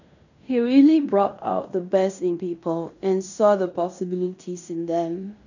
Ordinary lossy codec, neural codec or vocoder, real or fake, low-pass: none; codec, 16 kHz in and 24 kHz out, 0.9 kbps, LongCat-Audio-Codec, fine tuned four codebook decoder; fake; 7.2 kHz